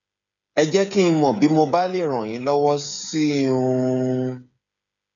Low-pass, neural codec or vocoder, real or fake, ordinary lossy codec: 7.2 kHz; codec, 16 kHz, 8 kbps, FreqCodec, smaller model; fake; none